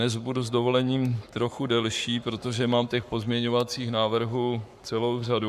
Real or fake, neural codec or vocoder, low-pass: fake; codec, 44.1 kHz, 7.8 kbps, Pupu-Codec; 14.4 kHz